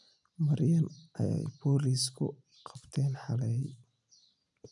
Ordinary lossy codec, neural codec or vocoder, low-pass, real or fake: none; none; 10.8 kHz; real